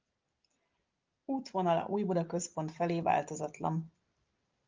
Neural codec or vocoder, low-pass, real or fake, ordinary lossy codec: none; 7.2 kHz; real; Opus, 24 kbps